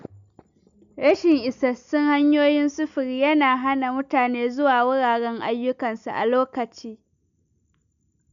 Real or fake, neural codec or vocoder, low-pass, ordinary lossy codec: real; none; 7.2 kHz; none